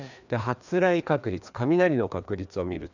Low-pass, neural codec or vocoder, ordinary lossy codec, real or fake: 7.2 kHz; codec, 16 kHz, 2 kbps, FreqCodec, larger model; none; fake